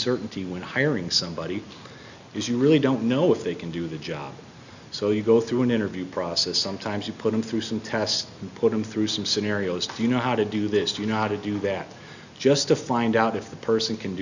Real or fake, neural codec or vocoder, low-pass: real; none; 7.2 kHz